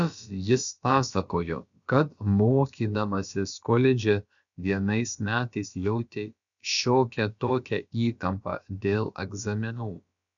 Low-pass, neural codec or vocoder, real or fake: 7.2 kHz; codec, 16 kHz, about 1 kbps, DyCAST, with the encoder's durations; fake